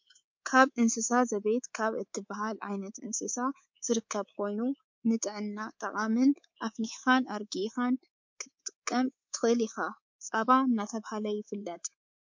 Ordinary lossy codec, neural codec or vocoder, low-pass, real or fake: MP3, 48 kbps; codec, 24 kHz, 3.1 kbps, DualCodec; 7.2 kHz; fake